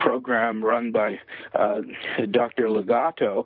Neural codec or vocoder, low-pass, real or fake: vocoder, 44.1 kHz, 128 mel bands, Pupu-Vocoder; 5.4 kHz; fake